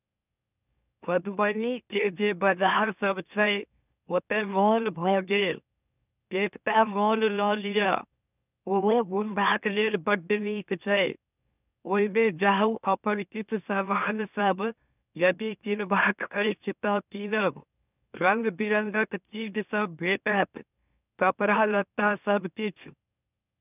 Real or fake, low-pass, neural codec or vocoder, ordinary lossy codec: fake; 3.6 kHz; autoencoder, 44.1 kHz, a latent of 192 numbers a frame, MeloTTS; none